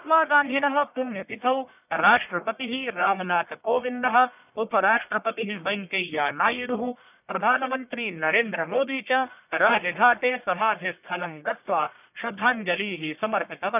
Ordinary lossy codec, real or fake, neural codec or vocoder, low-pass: none; fake; codec, 44.1 kHz, 1.7 kbps, Pupu-Codec; 3.6 kHz